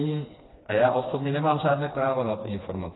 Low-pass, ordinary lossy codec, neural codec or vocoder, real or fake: 7.2 kHz; AAC, 16 kbps; codec, 16 kHz, 2 kbps, FreqCodec, smaller model; fake